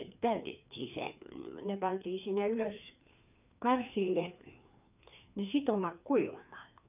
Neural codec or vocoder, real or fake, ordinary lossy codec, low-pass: codec, 16 kHz, 2 kbps, FreqCodec, larger model; fake; none; 3.6 kHz